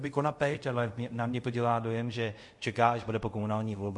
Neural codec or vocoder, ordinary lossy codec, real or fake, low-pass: codec, 24 kHz, 0.5 kbps, DualCodec; MP3, 48 kbps; fake; 10.8 kHz